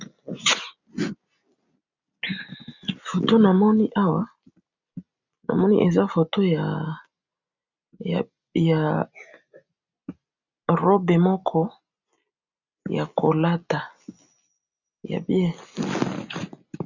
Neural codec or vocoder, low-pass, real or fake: none; 7.2 kHz; real